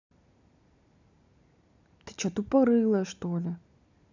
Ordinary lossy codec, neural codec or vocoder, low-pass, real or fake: none; none; 7.2 kHz; real